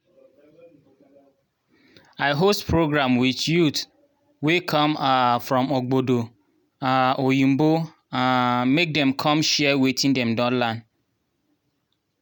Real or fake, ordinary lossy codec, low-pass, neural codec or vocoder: real; none; none; none